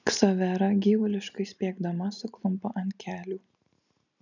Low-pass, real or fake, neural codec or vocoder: 7.2 kHz; real; none